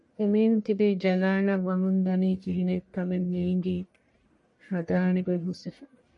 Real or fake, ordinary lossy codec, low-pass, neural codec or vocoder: fake; MP3, 48 kbps; 10.8 kHz; codec, 44.1 kHz, 1.7 kbps, Pupu-Codec